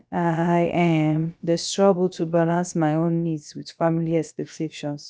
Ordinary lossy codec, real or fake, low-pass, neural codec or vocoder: none; fake; none; codec, 16 kHz, about 1 kbps, DyCAST, with the encoder's durations